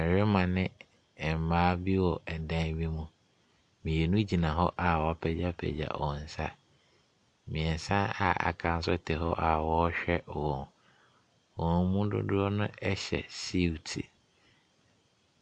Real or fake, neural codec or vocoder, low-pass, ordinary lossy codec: real; none; 9.9 kHz; MP3, 64 kbps